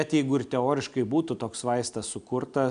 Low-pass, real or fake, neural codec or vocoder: 9.9 kHz; real; none